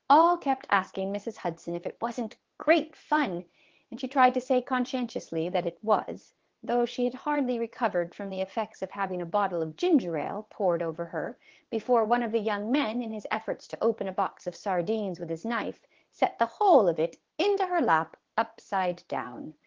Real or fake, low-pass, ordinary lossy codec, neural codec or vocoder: fake; 7.2 kHz; Opus, 16 kbps; vocoder, 22.05 kHz, 80 mel bands, WaveNeXt